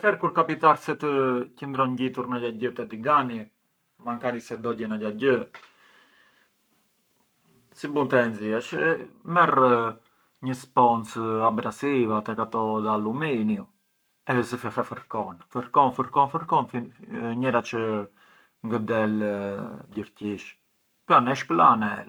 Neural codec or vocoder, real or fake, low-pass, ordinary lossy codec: codec, 44.1 kHz, 7.8 kbps, Pupu-Codec; fake; none; none